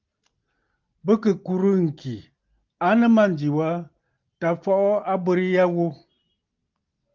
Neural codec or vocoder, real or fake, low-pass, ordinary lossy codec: none; real; 7.2 kHz; Opus, 32 kbps